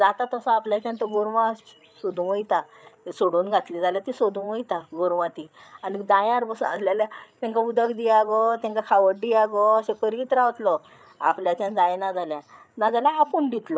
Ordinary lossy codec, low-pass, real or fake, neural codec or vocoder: none; none; fake; codec, 16 kHz, 8 kbps, FreqCodec, larger model